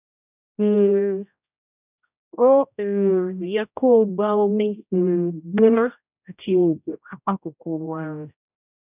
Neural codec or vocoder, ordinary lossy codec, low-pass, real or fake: codec, 16 kHz, 0.5 kbps, X-Codec, HuBERT features, trained on general audio; none; 3.6 kHz; fake